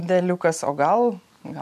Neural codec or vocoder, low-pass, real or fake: vocoder, 44.1 kHz, 128 mel bands every 512 samples, BigVGAN v2; 14.4 kHz; fake